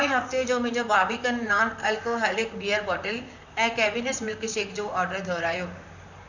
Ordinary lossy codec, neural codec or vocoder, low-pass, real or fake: none; vocoder, 44.1 kHz, 128 mel bands, Pupu-Vocoder; 7.2 kHz; fake